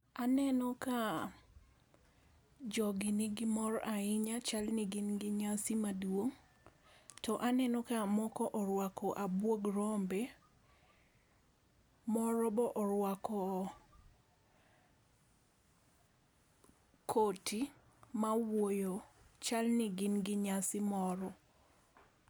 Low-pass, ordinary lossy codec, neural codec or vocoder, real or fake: none; none; none; real